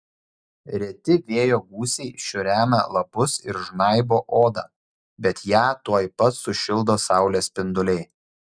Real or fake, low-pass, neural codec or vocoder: real; 9.9 kHz; none